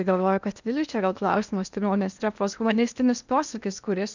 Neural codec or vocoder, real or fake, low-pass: codec, 16 kHz in and 24 kHz out, 0.8 kbps, FocalCodec, streaming, 65536 codes; fake; 7.2 kHz